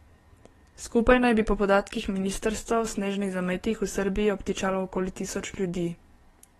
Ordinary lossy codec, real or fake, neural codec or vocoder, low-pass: AAC, 32 kbps; fake; codec, 44.1 kHz, 7.8 kbps, DAC; 19.8 kHz